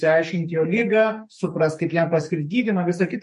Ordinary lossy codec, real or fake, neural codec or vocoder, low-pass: MP3, 48 kbps; fake; codec, 44.1 kHz, 2.6 kbps, SNAC; 14.4 kHz